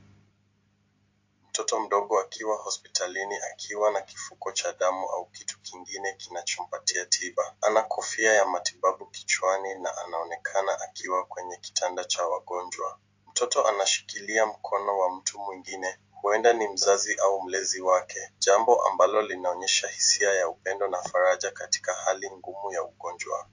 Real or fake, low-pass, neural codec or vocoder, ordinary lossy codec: real; 7.2 kHz; none; AAC, 48 kbps